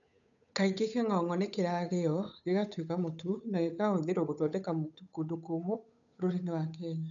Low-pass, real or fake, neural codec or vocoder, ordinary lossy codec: 7.2 kHz; fake; codec, 16 kHz, 8 kbps, FunCodec, trained on Chinese and English, 25 frames a second; none